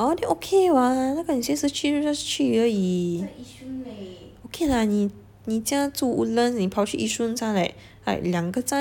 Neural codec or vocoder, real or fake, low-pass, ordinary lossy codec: none; real; 19.8 kHz; none